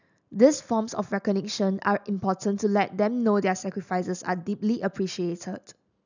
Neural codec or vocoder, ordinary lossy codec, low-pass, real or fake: none; none; 7.2 kHz; real